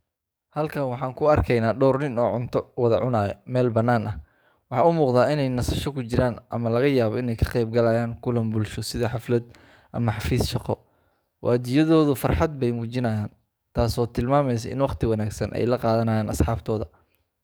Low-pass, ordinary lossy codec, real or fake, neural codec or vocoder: none; none; fake; codec, 44.1 kHz, 7.8 kbps, DAC